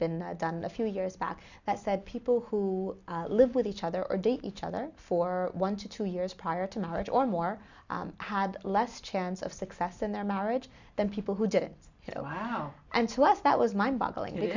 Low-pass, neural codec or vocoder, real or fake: 7.2 kHz; none; real